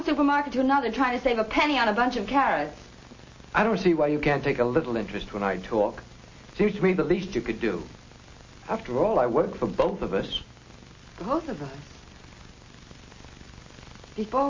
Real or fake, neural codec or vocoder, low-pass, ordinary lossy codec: real; none; 7.2 kHz; MP3, 32 kbps